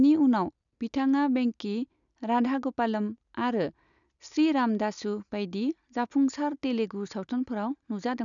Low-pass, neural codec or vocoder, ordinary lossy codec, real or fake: 7.2 kHz; none; none; real